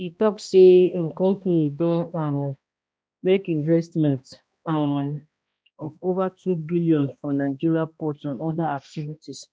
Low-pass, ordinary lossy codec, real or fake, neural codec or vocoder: none; none; fake; codec, 16 kHz, 1 kbps, X-Codec, HuBERT features, trained on balanced general audio